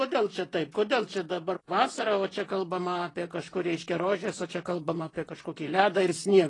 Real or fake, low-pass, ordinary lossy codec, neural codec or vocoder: fake; 10.8 kHz; AAC, 32 kbps; vocoder, 44.1 kHz, 128 mel bands, Pupu-Vocoder